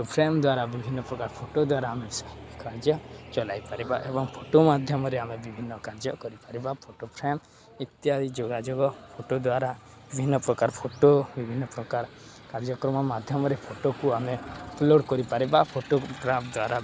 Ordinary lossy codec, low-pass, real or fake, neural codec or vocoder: none; none; real; none